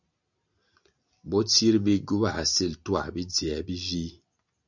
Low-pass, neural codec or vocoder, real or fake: 7.2 kHz; none; real